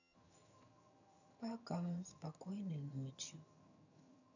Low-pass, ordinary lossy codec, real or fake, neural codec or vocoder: 7.2 kHz; none; fake; vocoder, 22.05 kHz, 80 mel bands, HiFi-GAN